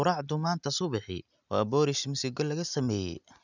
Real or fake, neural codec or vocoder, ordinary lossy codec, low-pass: real; none; none; 7.2 kHz